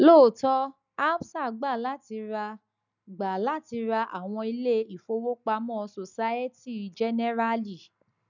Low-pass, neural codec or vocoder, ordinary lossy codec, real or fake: 7.2 kHz; none; none; real